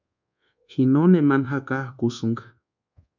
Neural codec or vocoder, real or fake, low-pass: codec, 24 kHz, 1.2 kbps, DualCodec; fake; 7.2 kHz